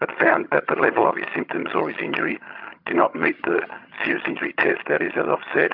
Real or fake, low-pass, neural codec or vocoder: fake; 5.4 kHz; vocoder, 22.05 kHz, 80 mel bands, HiFi-GAN